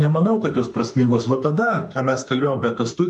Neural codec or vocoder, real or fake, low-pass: autoencoder, 48 kHz, 32 numbers a frame, DAC-VAE, trained on Japanese speech; fake; 10.8 kHz